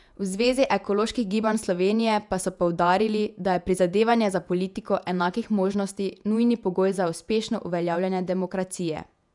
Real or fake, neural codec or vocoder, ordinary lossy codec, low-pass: fake; vocoder, 48 kHz, 128 mel bands, Vocos; none; 10.8 kHz